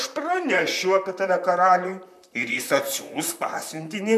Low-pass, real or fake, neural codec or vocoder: 14.4 kHz; fake; vocoder, 44.1 kHz, 128 mel bands, Pupu-Vocoder